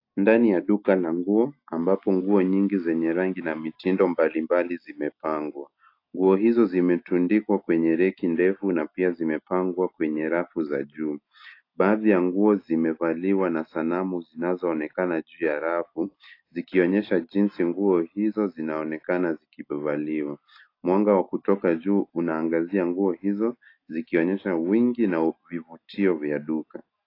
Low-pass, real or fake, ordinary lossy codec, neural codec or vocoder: 5.4 kHz; real; AAC, 32 kbps; none